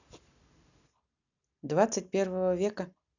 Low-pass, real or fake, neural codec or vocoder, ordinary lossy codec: 7.2 kHz; real; none; none